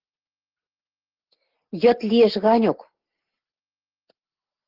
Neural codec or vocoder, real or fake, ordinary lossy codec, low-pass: none; real; Opus, 16 kbps; 5.4 kHz